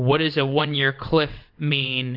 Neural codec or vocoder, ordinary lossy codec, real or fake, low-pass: vocoder, 22.05 kHz, 80 mel bands, WaveNeXt; MP3, 48 kbps; fake; 5.4 kHz